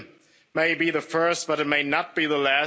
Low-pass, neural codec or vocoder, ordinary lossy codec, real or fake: none; none; none; real